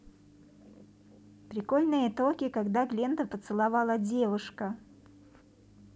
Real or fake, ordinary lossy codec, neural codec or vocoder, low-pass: real; none; none; none